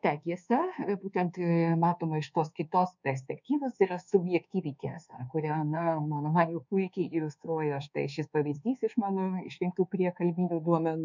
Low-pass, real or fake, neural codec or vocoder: 7.2 kHz; fake; codec, 24 kHz, 1.2 kbps, DualCodec